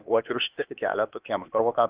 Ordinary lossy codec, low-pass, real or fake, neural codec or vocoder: Opus, 24 kbps; 3.6 kHz; fake; codec, 16 kHz, 0.8 kbps, ZipCodec